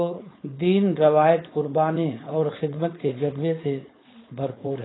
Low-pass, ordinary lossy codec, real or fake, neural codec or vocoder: 7.2 kHz; AAC, 16 kbps; fake; codec, 16 kHz, 4.8 kbps, FACodec